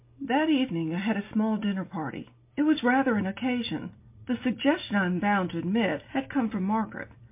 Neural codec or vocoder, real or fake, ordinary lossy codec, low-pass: none; real; MP3, 24 kbps; 3.6 kHz